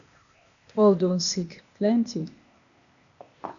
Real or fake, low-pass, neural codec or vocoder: fake; 7.2 kHz; codec, 16 kHz, 0.8 kbps, ZipCodec